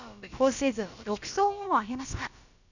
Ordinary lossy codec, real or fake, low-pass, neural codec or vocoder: none; fake; 7.2 kHz; codec, 16 kHz, about 1 kbps, DyCAST, with the encoder's durations